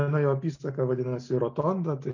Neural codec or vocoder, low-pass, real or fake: none; 7.2 kHz; real